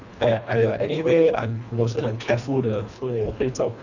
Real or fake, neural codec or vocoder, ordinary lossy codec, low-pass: fake; codec, 24 kHz, 1.5 kbps, HILCodec; none; 7.2 kHz